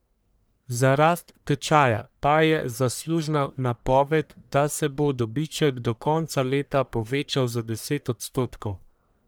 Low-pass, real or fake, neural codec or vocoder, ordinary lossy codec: none; fake; codec, 44.1 kHz, 1.7 kbps, Pupu-Codec; none